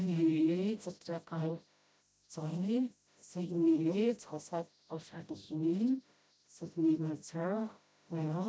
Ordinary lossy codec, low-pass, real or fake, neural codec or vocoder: none; none; fake; codec, 16 kHz, 0.5 kbps, FreqCodec, smaller model